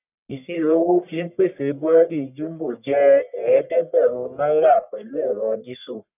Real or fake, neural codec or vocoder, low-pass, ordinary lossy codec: fake; codec, 44.1 kHz, 1.7 kbps, Pupu-Codec; 3.6 kHz; none